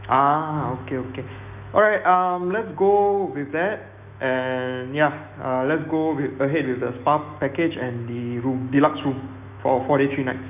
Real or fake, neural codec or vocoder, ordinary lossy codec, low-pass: fake; autoencoder, 48 kHz, 128 numbers a frame, DAC-VAE, trained on Japanese speech; none; 3.6 kHz